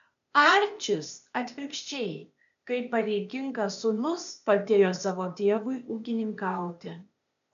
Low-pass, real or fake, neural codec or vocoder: 7.2 kHz; fake; codec, 16 kHz, 0.8 kbps, ZipCodec